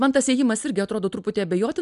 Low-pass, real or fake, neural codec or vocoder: 10.8 kHz; real; none